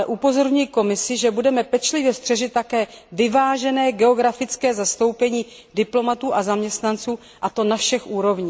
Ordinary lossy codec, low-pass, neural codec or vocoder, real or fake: none; none; none; real